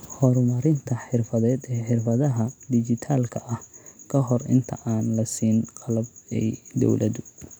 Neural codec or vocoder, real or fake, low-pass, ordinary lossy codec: none; real; none; none